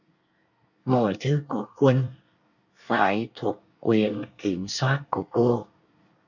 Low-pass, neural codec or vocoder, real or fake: 7.2 kHz; codec, 24 kHz, 1 kbps, SNAC; fake